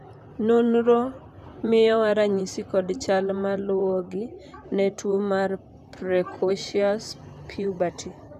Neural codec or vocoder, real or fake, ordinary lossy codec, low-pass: vocoder, 44.1 kHz, 128 mel bands, Pupu-Vocoder; fake; none; 14.4 kHz